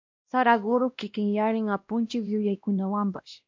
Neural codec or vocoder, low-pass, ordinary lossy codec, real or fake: codec, 16 kHz, 1 kbps, X-Codec, WavLM features, trained on Multilingual LibriSpeech; 7.2 kHz; MP3, 48 kbps; fake